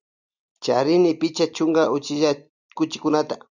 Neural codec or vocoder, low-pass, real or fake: none; 7.2 kHz; real